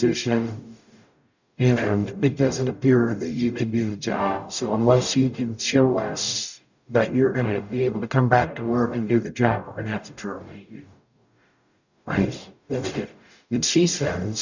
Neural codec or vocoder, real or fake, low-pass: codec, 44.1 kHz, 0.9 kbps, DAC; fake; 7.2 kHz